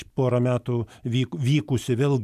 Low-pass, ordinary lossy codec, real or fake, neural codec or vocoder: 14.4 kHz; MP3, 96 kbps; real; none